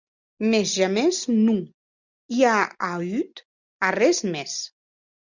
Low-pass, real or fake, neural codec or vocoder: 7.2 kHz; real; none